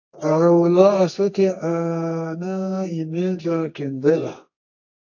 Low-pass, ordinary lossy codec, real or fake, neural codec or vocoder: 7.2 kHz; AAC, 48 kbps; fake; codec, 24 kHz, 0.9 kbps, WavTokenizer, medium music audio release